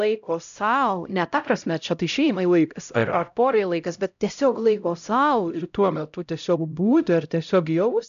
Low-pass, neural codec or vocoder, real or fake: 7.2 kHz; codec, 16 kHz, 0.5 kbps, X-Codec, HuBERT features, trained on LibriSpeech; fake